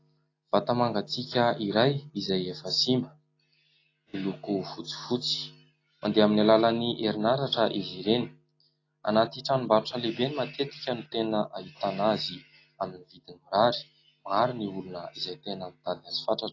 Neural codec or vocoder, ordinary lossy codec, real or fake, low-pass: none; AAC, 32 kbps; real; 7.2 kHz